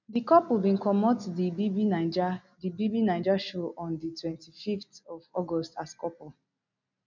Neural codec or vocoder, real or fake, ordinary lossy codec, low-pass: none; real; none; 7.2 kHz